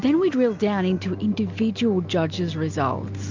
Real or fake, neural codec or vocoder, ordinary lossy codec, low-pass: real; none; MP3, 64 kbps; 7.2 kHz